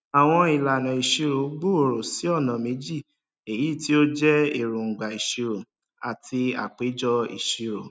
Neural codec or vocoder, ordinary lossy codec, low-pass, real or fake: none; none; none; real